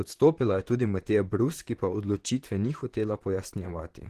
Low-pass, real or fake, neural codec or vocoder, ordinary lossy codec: 14.4 kHz; fake; vocoder, 44.1 kHz, 128 mel bands, Pupu-Vocoder; Opus, 16 kbps